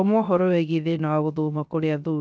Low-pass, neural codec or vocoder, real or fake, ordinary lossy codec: none; codec, 16 kHz, 0.3 kbps, FocalCodec; fake; none